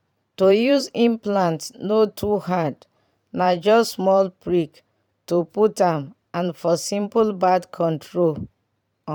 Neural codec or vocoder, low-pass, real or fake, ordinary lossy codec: vocoder, 44.1 kHz, 128 mel bands every 512 samples, BigVGAN v2; 19.8 kHz; fake; none